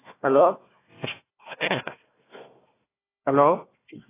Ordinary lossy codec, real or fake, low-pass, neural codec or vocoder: AAC, 16 kbps; fake; 3.6 kHz; codec, 16 kHz, 1 kbps, FunCodec, trained on Chinese and English, 50 frames a second